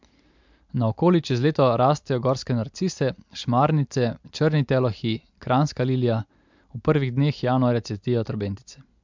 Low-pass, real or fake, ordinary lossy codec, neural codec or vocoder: 7.2 kHz; real; MP3, 64 kbps; none